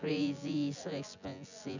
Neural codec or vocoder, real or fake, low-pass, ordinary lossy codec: vocoder, 24 kHz, 100 mel bands, Vocos; fake; 7.2 kHz; none